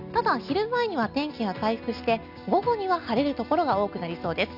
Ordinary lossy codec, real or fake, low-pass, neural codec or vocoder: none; real; 5.4 kHz; none